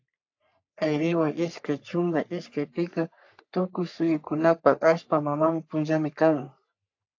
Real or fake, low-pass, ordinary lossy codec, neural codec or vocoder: fake; 7.2 kHz; AAC, 48 kbps; codec, 44.1 kHz, 3.4 kbps, Pupu-Codec